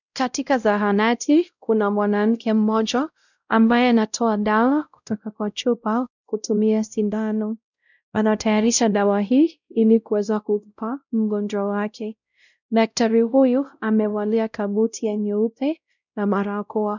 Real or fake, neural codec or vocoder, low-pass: fake; codec, 16 kHz, 0.5 kbps, X-Codec, WavLM features, trained on Multilingual LibriSpeech; 7.2 kHz